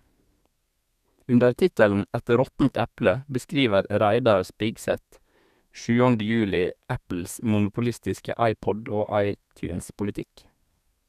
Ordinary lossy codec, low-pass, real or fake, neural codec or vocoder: none; 14.4 kHz; fake; codec, 32 kHz, 1.9 kbps, SNAC